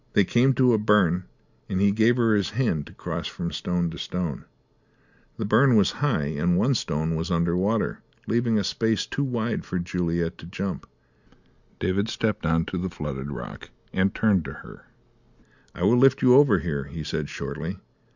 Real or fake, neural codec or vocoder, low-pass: real; none; 7.2 kHz